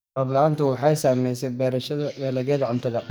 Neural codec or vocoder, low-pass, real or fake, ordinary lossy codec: codec, 44.1 kHz, 2.6 kbps, SNAC; none; fake; none